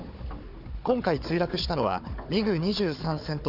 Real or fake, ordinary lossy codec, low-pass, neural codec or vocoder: fake; none; 5.4 kHz; codec, 16 kHz, 4 kbps, FunCodec, trained on Chinese and English, 50 frames a second